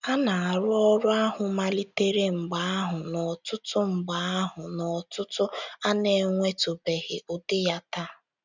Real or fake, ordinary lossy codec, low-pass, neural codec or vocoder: real; none; 7.2 kHz; none